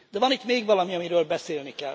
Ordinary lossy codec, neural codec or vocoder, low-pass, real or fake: none; none; none; real